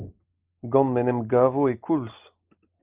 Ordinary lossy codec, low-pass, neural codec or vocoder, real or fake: Opus, 32 kbps; 3.6 kHz; none; real